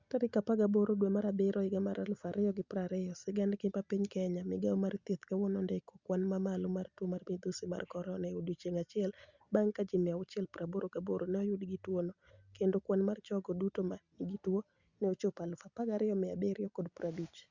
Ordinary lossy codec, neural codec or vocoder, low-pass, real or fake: Opus, 64 kbps; none; 7.2 kHz; real